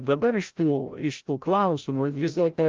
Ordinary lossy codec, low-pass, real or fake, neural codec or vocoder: Opus, 32 kbps; 7.2 kHz; fake; codec, 16 kHz, 0.5 kbps, FreqCodec, larger model